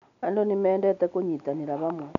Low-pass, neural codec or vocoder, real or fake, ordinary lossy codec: 7.2 kHz; none; real; none